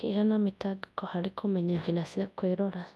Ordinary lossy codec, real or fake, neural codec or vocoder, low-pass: none; fake; codec, 24 kHz, 0.9 kbps, WavTokenizer, large speech release; none